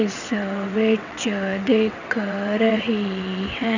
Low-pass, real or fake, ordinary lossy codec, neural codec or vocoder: 7.2 kHz; fake; none; vocoder, 22.05 kHz, 80 mel bands, WaveNeXt